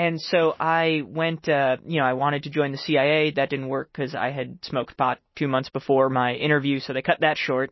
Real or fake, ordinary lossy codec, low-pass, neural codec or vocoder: real; MP3, 24 kbps; 7.2 kHz; none